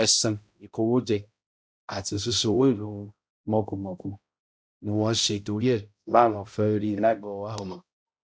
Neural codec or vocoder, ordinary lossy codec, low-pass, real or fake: codec, 16 kHz, 0.5 kbps, X-Codec, HuBERT features, trained on balanced general audio; none; none; fake